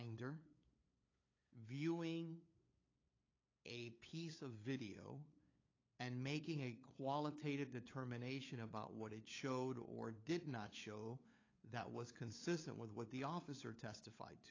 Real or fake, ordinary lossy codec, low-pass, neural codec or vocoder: fake; AAC, 32 kbps; 7.2 kHz; codec, 16 kHz, 8 kbps, FunCodec, trained on LibriTTS, 25 frames a second